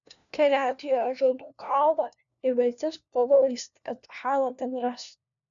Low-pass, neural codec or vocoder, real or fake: 7.2 kHz; codec, 16 kHz, 1 kbps, FunCodec, trained on LibriTTS, 50 frames a second; fake